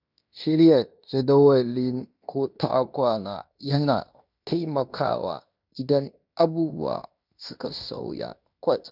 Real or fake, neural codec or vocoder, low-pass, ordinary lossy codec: fake; codec, 16 kHz in and 24 kHz out, 0.9 kbps, LongCat-Audio-Codec, fine tuned four codebook decoder; 5.4 kHz; none